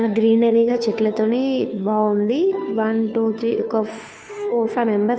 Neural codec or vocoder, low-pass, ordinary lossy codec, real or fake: codec, 16 kHz, 2 kbps, FunCodec, trained on Chinese and English, 25 frames a second; none; none; fake